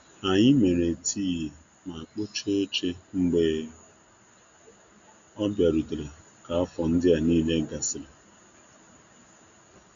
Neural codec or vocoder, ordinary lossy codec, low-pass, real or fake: none; Opus, 64 kbps; 7.2 kHz; real